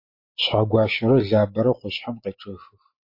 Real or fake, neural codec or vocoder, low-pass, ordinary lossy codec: real; none; 5.4 kHz; MP3, 32 kbps